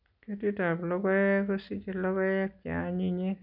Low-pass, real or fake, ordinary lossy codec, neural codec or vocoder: 5.4 kHz; real; none; none